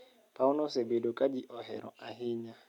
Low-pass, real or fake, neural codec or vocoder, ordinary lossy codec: 19.8 kHz; real; none; none